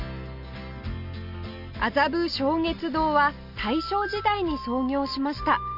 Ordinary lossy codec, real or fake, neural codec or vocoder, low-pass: none; real; none; 5.4 kHz